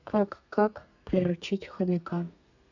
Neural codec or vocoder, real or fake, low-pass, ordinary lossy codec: codec, 32 kHz, 1.9 kbps, SNAC; fake; 7.2 kHz; none